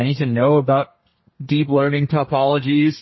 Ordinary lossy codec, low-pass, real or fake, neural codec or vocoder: MP3, 24 kbps; 7.2 kHz; fake; codec, 32 kHz, 1.9 kbps, SNAC